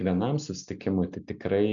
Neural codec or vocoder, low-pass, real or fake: none; 7.2 kHz; real